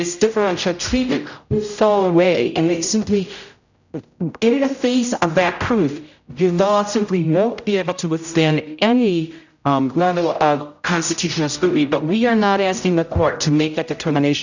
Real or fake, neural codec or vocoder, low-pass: fake; codec, 16 kHz, 0.5 kbps, X-Codec, HuBERT features, trained on general audio; 7.2 kHz